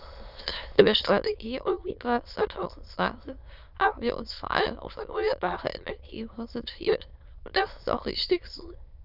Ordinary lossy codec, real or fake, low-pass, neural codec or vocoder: none; fake; 5.4 kHz; autoencoder, 22.05 kHz, a latent of 192 numbers a frame, VITS, trained on many speakers